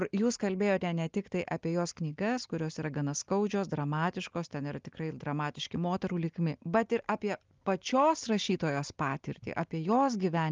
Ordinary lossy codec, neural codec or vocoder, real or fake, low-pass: Opus, 32 kbps; none; real; 7.2 kHz